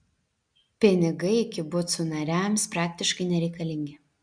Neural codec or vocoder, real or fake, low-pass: none; real; 9.9 kHz